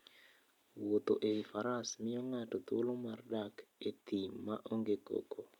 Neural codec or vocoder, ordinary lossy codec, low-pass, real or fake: none; MP3, 96 kbps; 19.8 kHz; real